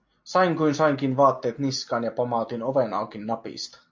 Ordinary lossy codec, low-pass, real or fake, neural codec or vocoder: MP3, 48 kbps; 7.2 kHz; real; none